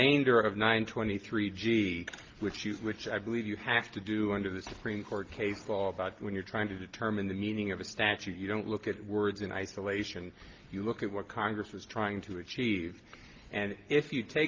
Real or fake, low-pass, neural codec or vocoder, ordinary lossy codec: real; 7.2 kHz; none; Opus, 32 kbps